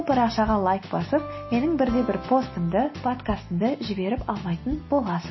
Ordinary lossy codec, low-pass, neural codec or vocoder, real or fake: MP3, 24 kbps; 7.2 kHz; none; real